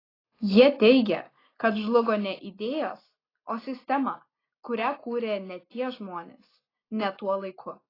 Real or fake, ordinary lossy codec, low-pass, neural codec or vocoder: real; AAC, 24 kbps; 5.4 kHz; none